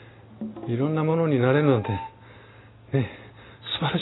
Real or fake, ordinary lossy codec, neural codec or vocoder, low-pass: real; AAC, 16 kbps; none; 7.2 kHz